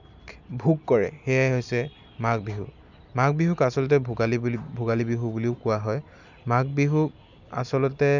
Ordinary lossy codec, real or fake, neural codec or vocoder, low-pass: none; real; none; 7.2 kHz